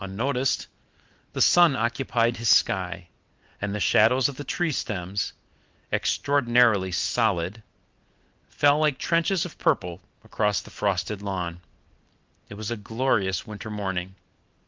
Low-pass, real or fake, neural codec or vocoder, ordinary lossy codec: 7.2 kHz; real; none; Opus, 32 kbps